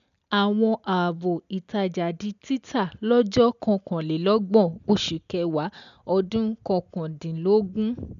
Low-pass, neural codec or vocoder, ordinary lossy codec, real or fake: 7.2 kHz; none; none; real